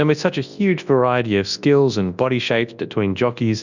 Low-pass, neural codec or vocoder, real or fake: 7.2 kHz; codec, 24 kHz, 0.9 kbps, WavTokenizer, large speech release; fake